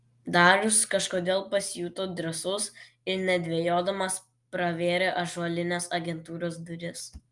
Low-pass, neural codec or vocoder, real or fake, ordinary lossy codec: 10.8 kHz; none; real; Opus, 32 kbps